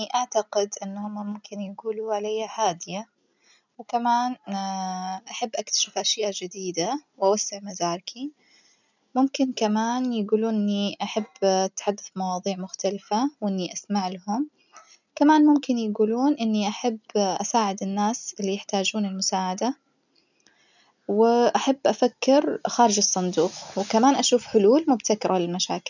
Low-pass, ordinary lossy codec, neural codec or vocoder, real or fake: 7.2 kHz; none; none; real